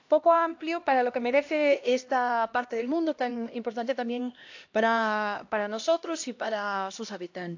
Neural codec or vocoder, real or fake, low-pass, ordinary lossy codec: codec, 16 kHz, 1 kbps, X-Codec, HuBERT features, trained on LibriSpeech; fake; 7.2 kHz; AAC, 48 kbps